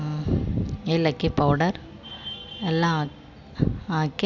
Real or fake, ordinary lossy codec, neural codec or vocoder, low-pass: real; none; none; 7.2 kHz